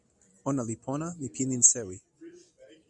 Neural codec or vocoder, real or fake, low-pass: none; real; 10.8 kHz